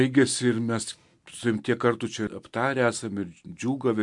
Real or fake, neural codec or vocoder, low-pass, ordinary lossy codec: real; none; 10.8 kHz; MP3, 64 kbps